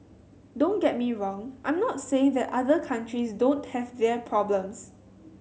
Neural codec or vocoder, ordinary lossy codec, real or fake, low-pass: none; none; real; none